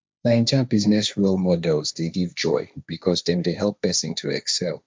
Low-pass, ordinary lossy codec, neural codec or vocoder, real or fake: none; none; codec, 16 kHz, 1.1 kbps, Voila-Tokenizer; fake